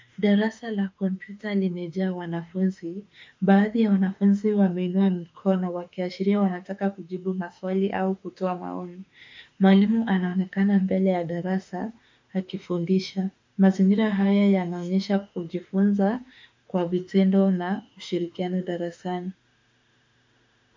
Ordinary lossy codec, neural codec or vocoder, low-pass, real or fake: MP3, 64 kbps; autoencoder, 48 kHz, 32 numbers a frame, DAC-VAE, trained on Japanese speech; 7.2 kHz; fake